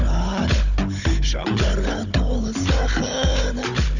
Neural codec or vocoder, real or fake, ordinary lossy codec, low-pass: codec, 16 kHz, 16 kbps, FunCodec, trained on Chinese and English, 50 frames a second; fake; none; 7.2 kHz